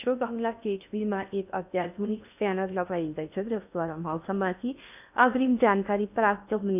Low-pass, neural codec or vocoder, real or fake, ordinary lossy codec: 3.6 kHz; codec, 16 kHz in and 24 kHz out, 0.6 kbps, FocalCodec, streaming, 2048 codes; fake; none